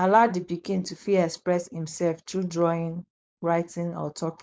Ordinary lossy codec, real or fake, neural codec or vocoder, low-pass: none; fake; codec, 16 kHz, 4.8 kbps, FACodec; none